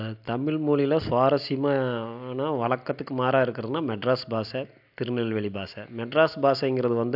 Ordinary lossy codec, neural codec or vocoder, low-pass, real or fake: AAC, 48 kbps; none; 5.4 kHz; real